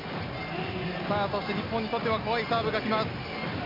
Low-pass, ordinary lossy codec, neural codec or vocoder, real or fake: 5.4 kHz; MP3, 48 kbps; none; real